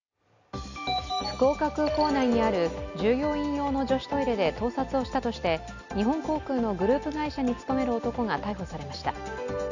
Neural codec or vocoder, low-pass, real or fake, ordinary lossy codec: none; 7.2 kHz; real; none